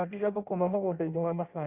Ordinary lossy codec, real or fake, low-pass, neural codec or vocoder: Opus, 64 kbps; fake; 3.6 kHz; codec, 16 kHz in and 24 kHz out, 0.6 kbps, FireRedTTS-2 codec